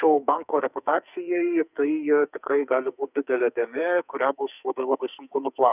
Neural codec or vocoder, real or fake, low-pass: codec, 44.1 kHz, 2.6 kbps, SNAC; fake; 3.6 kHz